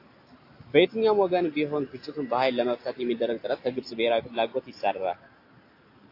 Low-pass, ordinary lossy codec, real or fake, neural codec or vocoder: 5.4 kHz; AAC, 32 kbps; real; none